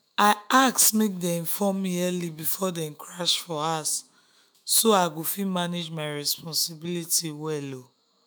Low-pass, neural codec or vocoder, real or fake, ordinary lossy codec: none; autoencoder, 48 kHz, 128 numbers a frame, DAC-VAE, trained on Japanese speech; fake; none